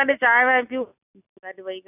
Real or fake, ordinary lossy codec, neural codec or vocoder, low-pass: real; none; none; 3.6 kHz